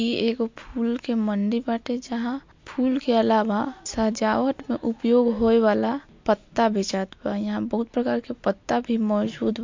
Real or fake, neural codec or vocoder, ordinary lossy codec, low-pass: real; none; MP3, 48 kbps; 7.2 kHz